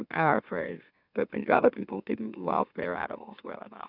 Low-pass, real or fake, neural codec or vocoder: 5.4 kHz; fake; autoencoder, 44.1 kHz, a latent of 192 numbers a frame, MeloTTS